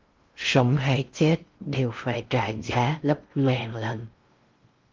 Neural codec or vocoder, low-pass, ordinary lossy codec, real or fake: codec, 16 kHz in and 24 kHz out, 0.6 kbps, FocalCodec, streaming, 2048 codes; 7.2 kHz; Opus, 32 kbps; fake